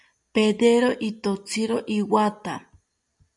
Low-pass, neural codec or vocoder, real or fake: 10.8 kHz; none; real